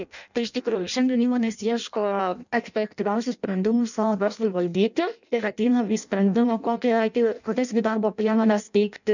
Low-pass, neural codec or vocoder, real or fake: 7.2 kHz; codec, 16 kHz in and 24 kHz out, 0.6 kbps, FireRedTTS-2 codec; fake